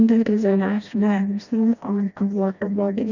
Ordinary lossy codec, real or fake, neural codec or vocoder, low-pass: none; fake; codec, 16 kHz, 1 kbps, FreqCodec, smaller model; 7.2 kHz